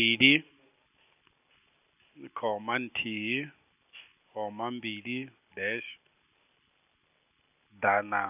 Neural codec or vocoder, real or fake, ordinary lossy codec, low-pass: none; real; none; 3.6 kHz